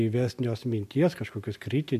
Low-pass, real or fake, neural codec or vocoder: 14.4 kHz; real; none